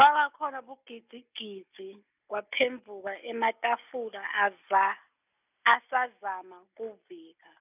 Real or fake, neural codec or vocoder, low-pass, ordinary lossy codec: real; none; 3.6 kHz; none